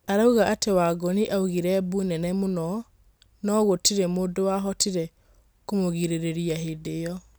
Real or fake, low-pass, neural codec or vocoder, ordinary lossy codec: real; none; none; none